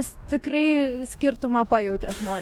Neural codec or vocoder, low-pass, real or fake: codec, 44.1 kHz, 2.6 kbps, DAC; 19.8 kHz; fake